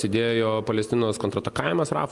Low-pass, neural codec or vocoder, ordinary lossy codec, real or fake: 10.8 kHz; none; Opus, 32 kbps; real